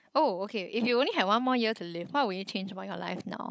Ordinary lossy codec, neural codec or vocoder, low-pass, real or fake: none; codec, 16 kHz, 16 kbps, FunCodec, trained on Chinese and English, 50 frames a second; none; fake